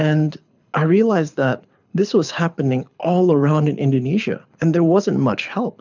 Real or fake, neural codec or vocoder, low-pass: fake; codec, 24 kHz, 6 kbps, HILCodec; 7.2 kHz